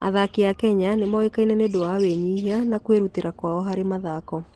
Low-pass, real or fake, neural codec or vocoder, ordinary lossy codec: 10.8 kHz; real; none; Opus, 16 kbps